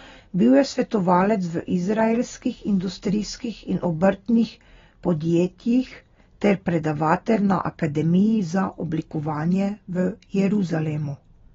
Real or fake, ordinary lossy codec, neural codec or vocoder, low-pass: real; AAC, 24 kbps; none; 7.2 kHz